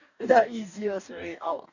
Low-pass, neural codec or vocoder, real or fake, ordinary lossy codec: 7.2 kHz; codec, 44.1 kHz, 2.6 kbps, DAC; fake; none